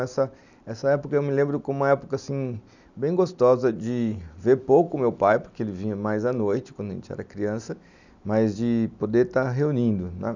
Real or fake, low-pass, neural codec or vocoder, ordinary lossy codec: real; 7.2 kHz; none; none